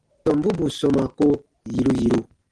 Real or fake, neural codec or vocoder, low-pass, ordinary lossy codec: real; none; 9.9 kHz; Opus, 16 kbps